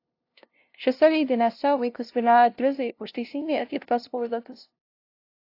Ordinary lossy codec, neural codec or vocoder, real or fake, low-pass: AAC, 32 kbps; codec, 16 kHz, 0.5 kbps, FunCodec, trained on LibriTTS, 25 frames a second; fake; 5.4 kHz